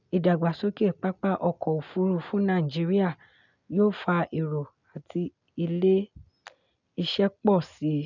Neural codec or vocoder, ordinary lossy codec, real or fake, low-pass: none; none; real; 7.2 kHz